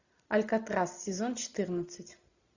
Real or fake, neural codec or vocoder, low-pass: real; none; 7.2 kHz